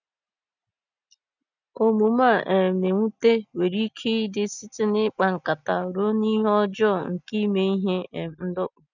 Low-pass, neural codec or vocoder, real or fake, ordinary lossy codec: 7.2 kHz; none; real; none